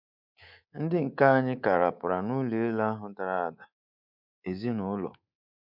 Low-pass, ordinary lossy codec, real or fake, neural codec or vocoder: 5.4 kHz; none; fake; codec, 24 kHz, 3.1 kbps, DualCodec